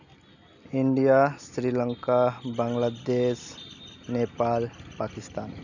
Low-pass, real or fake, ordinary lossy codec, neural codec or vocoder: 7.2 kHz; real; none; none